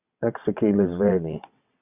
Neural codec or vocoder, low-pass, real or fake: vocoder, 22.05 kHz, 80 mel bands, WaveNeXt; 3.6 kHz; fake